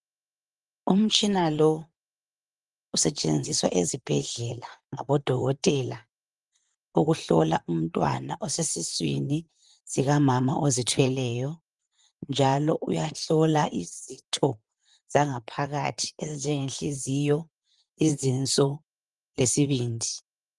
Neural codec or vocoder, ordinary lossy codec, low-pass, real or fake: vocoder, 44.1 kHz, 128 mel bands, Pupu-Vocoder; Opus, 32 kbps; 10.8 kHz; fake